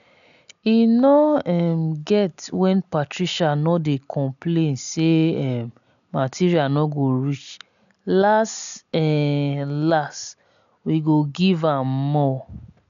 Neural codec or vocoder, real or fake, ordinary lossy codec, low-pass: none; real; none; 7.2 kHz